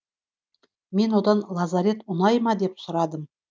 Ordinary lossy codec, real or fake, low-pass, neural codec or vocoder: none; real; none; none